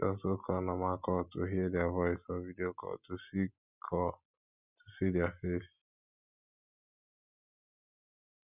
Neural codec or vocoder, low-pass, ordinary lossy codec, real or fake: none; 3.6 kHz; none; real